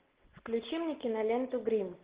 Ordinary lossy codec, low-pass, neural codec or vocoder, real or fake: Opus, 16 kbps; 3.6 kHz; none; real